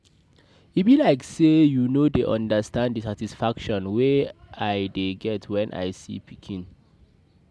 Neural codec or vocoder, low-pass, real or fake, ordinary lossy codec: none; none; real; none